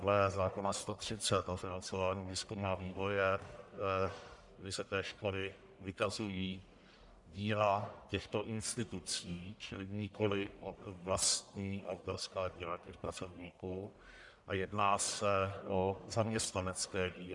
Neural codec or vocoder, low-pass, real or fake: codec, 44.1 kHz, 1.7 kbps, Pupu-Codec; 10.8 kHz; fake